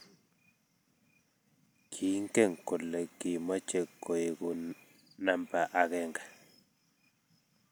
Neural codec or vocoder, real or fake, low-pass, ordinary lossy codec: none; real; none; none